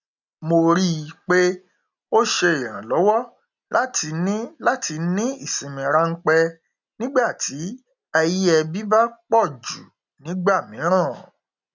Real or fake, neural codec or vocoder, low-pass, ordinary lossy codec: real; none; 7.2 kHz; none